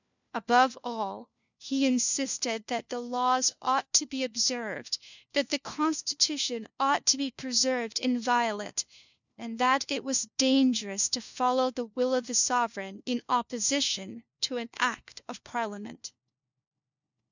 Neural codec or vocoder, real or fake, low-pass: codec, 16 kHz, 1 kbps, FunCodec, trained on LibriTTS, 50 frames a second; fake; 7.2 kHz